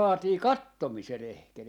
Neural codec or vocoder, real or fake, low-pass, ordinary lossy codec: none; real; 19.8 kHz; none